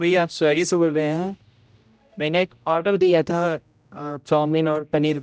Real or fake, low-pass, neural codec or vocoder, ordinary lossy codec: fake; none; codec, 16 kHz, 0.5 kbps, X-Codec, HuBERT features, trained on general audio; none